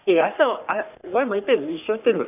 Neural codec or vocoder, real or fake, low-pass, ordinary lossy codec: codec, 44.1 kHz, 3.4 kbps, Pupu-Codec; fake; 3.6 kHz; none